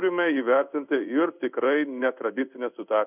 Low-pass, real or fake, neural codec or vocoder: 3.6 kHz; fake; codec, 16 kHz in and 24 kHz out, 1 kbps, XY-Tokenizer